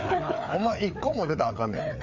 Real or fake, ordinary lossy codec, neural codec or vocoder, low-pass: fake; MP3, 64 kbps; codec, 16 kHz, 4 kbps, FreqCodec, larger model; 7.2 kHz